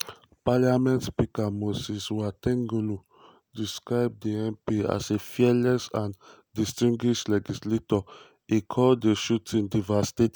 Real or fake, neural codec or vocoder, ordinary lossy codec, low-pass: real; none; none; none